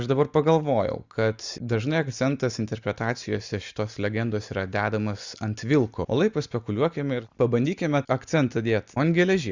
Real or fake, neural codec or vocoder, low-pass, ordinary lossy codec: real; none; 7.2 kHz; Opus, 64 kbps